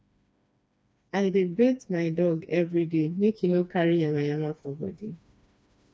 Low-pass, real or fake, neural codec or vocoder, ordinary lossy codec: none; fake; codec, 16 kHz, 2 kbps, FreqCodec, smaller model; none